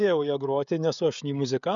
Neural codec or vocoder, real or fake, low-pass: codec, 16 kHz, 16 kbps, FreqCodec, smaller model; fake; 7.2 kHz